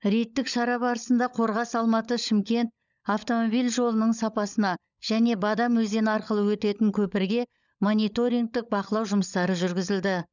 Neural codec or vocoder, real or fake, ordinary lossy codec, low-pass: codec, 16 kHz, 16 kbps, FunCodec, trained on LibriTTS, 50 frames a second; fake; none; 7.2 kHz